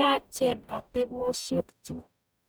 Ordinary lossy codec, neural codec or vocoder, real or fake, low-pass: none; codec, 44.1 kHz, 0.9 kbps, DAC; fake; none